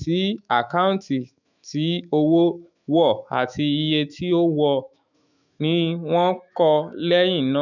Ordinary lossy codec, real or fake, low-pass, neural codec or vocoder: none; fake; 7.2 kHz; autoencoder, 48 kHz, 128 numbers a frame, DAC-VAE, trained on Japanese speech